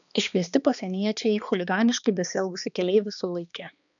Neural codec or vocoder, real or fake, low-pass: codec, 16 kHz, 2 kbps, X-Codec, HuBERT features, trained on balanced general audio; fake; 7.2 kHz